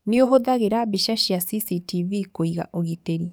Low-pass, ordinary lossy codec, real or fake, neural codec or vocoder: none; none; fake; codec, 44.1 kHz, 7.8 kbps, DAC